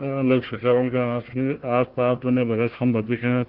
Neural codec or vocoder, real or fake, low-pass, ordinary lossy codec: codec, 16 kHz, 1 kbps, FunCodec, trained on Chinese and English, 50 frames a second; fake; 5.4 kHz; Opus, 16 kbps